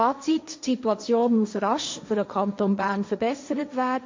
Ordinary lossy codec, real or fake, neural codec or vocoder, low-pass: MP3, 64 kbps; fake; codec, 16 kHz, 1.1 kbps, Voila-Tokenizer; 7.2 kHz